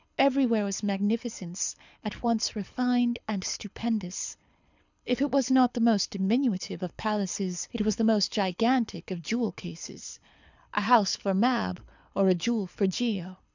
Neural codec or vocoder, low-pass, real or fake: codec, 24 kHz, 6 kbps, HILCodec; 7.2 kHz; fake